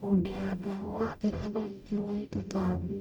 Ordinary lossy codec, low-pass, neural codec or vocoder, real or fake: none; 19.8 kHz; codec, 44.1 kHz, 0.9 kbps, DAC; fake